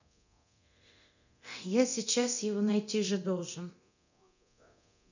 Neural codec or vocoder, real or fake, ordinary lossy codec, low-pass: codec, 24 kHz, 0.9 kbps, DualCodec; fake; none; 7.2 kHz